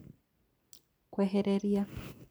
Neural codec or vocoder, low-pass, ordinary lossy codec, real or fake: vocoder, 44.1 kHz, 128 mel bands every 256 samples, BigVGAN v2; none; none; fake